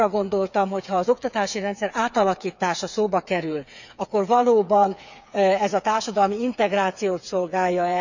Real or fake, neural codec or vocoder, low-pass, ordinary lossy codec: fake; codec, 16 kHz, 8 kbps, FreqCodec, smaller model; 7.2 kHz; none